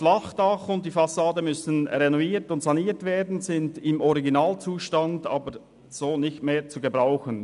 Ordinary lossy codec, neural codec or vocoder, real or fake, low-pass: none; none; real; 10.8 kHz